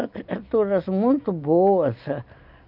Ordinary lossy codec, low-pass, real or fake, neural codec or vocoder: none; 5.4 kHz; real; none